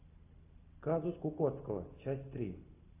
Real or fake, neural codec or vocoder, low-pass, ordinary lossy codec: real; none; 3.6 kHz; AAC, 24 kbps